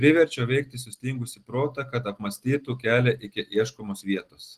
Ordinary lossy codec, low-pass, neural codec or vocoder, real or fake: Opus, 16 kbps; 14.4 kHz; none; real